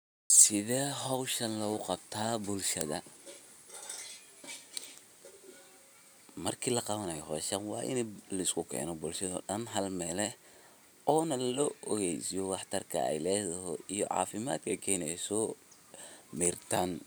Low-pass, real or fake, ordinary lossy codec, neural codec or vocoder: none; real; none; none